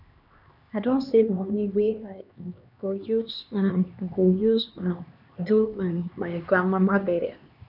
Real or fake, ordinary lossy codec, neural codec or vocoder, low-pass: fake; none; codec, 16 kHz, 2 kbps, X-Codec, HuBERT features, trained on LibriSpeech; 5.4 kHz